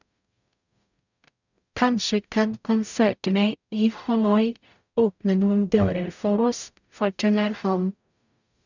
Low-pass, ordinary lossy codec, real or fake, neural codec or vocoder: 7.2 kHz; none; fake; codec, 44.1 kHz, 0.9 kbps, DAC